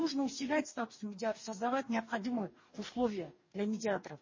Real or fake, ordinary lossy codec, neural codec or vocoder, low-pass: fake; MP3, 32 kbps; codec, 44.1 kHz, 2.6 kbps, DAC; 7.2 kHz